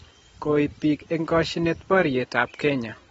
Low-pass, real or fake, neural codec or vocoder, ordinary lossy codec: 19.8 kHz; real; none; AAC, 24 kbps